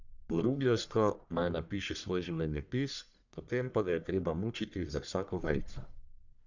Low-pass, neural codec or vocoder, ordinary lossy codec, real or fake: 7.2 kHz; codec, 44.1 kHz, 1.7 kbps, Pupu-Codec; none; fake